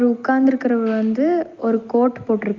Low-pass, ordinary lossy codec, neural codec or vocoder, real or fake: 7.2 kHz; Opus, 32 kbps; none; real